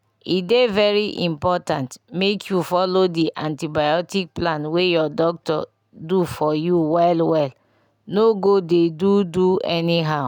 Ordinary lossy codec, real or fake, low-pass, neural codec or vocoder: none; real; 19.8 kHz; none